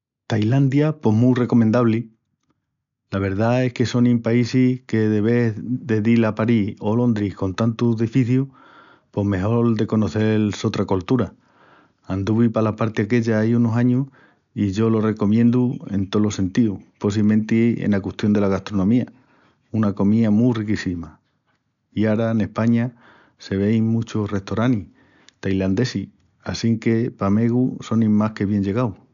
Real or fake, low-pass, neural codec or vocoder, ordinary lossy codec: real; 7.2 kHz; none; none